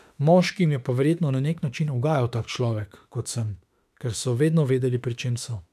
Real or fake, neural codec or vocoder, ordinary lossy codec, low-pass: fake; autoencoder, 48 kHz, 32 numbers a frame, DAC-VAE, trained on Japanese speech; none; 14.4 kHz